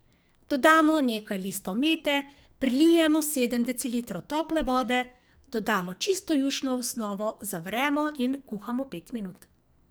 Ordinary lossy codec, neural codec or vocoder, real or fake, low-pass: none; codec, 44.1 kHz, 2.6 kbps, SNAC; fake; none